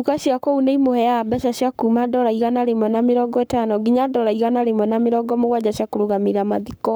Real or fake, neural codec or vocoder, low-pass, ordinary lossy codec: fake; codec, 44.1 kHz, 7.8 kbps, Pupu-Codec; none; none